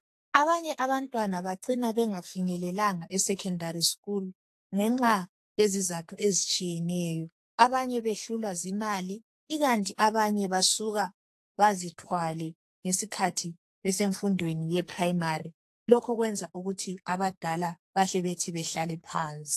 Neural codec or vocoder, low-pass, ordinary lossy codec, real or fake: codec, 44.1 kHz, 2.6 kbps, SNAC; 14.4 kHz; AAC, 64 kbps; fake